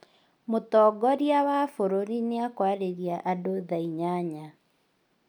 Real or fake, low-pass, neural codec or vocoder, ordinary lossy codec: real; 19.8 kHz; none; none